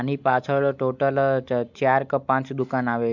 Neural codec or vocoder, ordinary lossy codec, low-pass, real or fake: none; none; 7.2 kHz; real